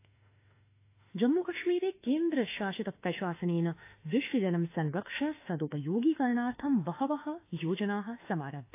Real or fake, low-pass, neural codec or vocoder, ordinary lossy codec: fake; 3.6 kHz; autoencoder, 48 kHz, 32 numbers a frame, DAC-VAE, trained on Japanese speech; AAC, 24 kbps